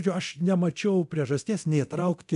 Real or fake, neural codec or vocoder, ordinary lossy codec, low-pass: fake; codec, 24 kHz, 0.9 kbps, DualCodec; MP3, 96 kbps; 10.8 kHz